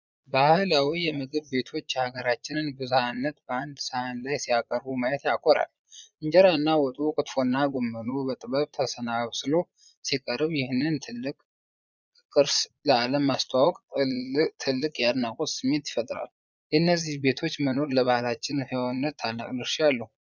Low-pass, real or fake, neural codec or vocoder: 7.2 kHz; fake; vocoder, 22.05 kHz, 80 mel bands, Vocos